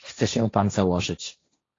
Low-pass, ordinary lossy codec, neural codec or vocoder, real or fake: 7.2 kHz; AAC, 32 kbps; codec, 16 kHz, 1.1 kbps, Voila-Tokenizer; fake